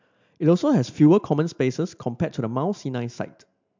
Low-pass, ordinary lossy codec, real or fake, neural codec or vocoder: 7.2 kHz; MP3, 64 kbps; real; none